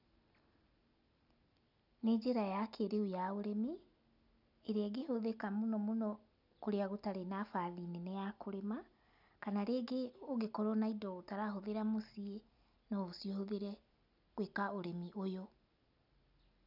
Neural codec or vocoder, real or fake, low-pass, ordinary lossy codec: none; real; 5.4 kHz; none